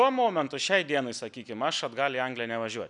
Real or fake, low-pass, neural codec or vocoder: real; 10.8 kHz; none